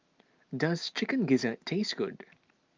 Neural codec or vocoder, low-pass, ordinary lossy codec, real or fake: none; 7.2 kHz; Opus, 24 kbps; real